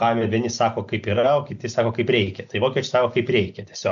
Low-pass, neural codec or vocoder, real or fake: 7.2 kHz; none; real